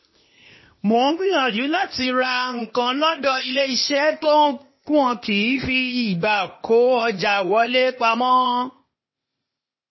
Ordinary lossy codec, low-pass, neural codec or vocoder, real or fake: MP3, 24 kbps; 7.2 kHz; codec, 16 kHz, 0.8 kbps, ZipCodec; fake